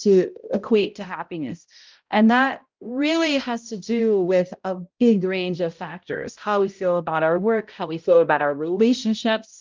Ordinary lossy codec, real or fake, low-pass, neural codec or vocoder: Opus, 32 kbps; fake; 7.2 kHz; codec, 16 kHz, 0.5 kbps, X-Codec, HuBERT features, trained on balanced general audio